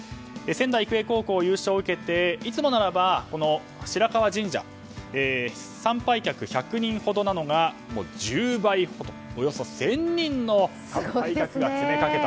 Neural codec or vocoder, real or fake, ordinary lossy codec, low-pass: none; real; none; none